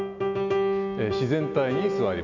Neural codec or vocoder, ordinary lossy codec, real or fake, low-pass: none; none; real; 7.2 kHz